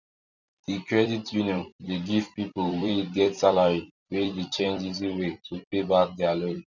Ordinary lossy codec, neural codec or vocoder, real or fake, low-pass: none; vocoder, 44.1 kHz, 128 mel bands every 256 samples, BigVGAN v2; fake; 7.2 kHz